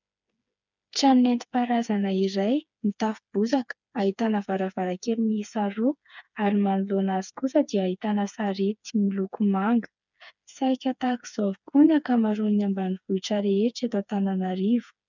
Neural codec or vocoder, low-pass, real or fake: codec, 16 kHz, 4 kbps, FreqCodec, smaller model; 7.2 kHz; fake